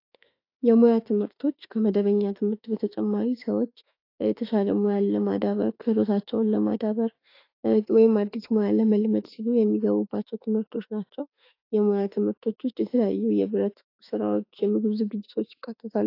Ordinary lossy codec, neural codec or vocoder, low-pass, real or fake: AAC, 32 kbps; autoencoder, 48 kHz, 32 numbers a frame, DAC-VAE, trained on Japanese speech; 5.4 kHz; fake